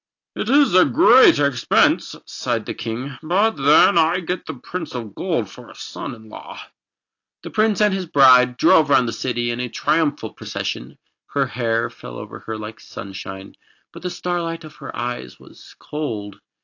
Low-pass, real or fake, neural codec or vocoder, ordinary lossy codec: 7.2 kHz; real; none; AAC, 48 kbps